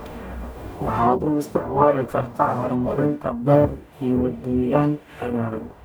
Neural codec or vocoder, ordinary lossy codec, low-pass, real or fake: codec, 44.1 kHz, 0.9 kbps, DAC; none; none; fake